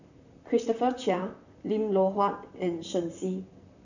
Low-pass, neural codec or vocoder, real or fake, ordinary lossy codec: 7.2 kHz; vocoder, 44.1 kHz, 128 mel bands, Pupu-Vocoder; fake; none